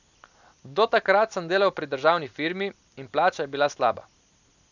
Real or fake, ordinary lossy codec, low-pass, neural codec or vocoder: real; none; 7.2 kHz; none